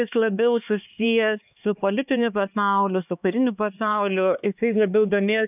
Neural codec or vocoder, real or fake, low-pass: codec, 16 kHz, 2 kbps, X-Codec, HuBERT features, trained on LibriSpeech; fake; 3.6 kHz